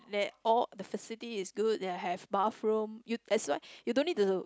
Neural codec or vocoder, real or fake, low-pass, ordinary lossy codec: none; real; none; none